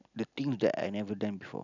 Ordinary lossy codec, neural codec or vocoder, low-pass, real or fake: none; none; 7.2 kHz; real